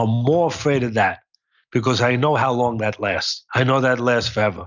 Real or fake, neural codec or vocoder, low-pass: real; none; 7.2 kHz